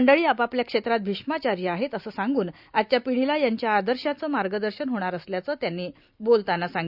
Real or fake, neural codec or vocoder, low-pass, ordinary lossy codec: real; none; 5.4 kHz; Opus, 64 kbps